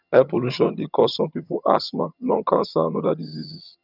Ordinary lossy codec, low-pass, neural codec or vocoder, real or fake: none; 5.4 kHz; vocoder, 22.05 kHz, 80 mel bands, HiFi-GAN; fake